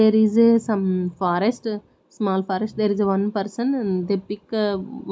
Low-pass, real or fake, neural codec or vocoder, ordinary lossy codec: none; real; none; none